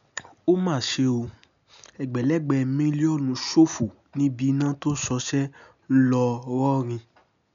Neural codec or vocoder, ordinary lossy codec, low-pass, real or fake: none; none; 7.2 kHz; real